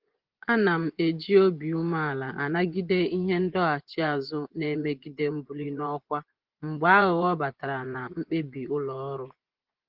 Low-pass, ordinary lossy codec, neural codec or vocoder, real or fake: 5.4 kHz; Opus, 16 kbps; vocoder, 24 kHz, 100 mel bands, Vocos; fake